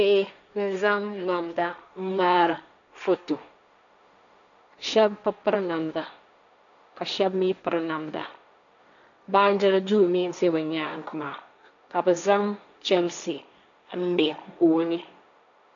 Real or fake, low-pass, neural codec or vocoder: fake; 7.2 kHz; codec, 16 kHz, 1.1 kbps, Voila-Tokenizer